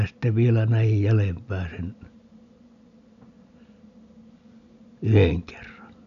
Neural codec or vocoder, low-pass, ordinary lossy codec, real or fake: none; 7.2 kHz; none; real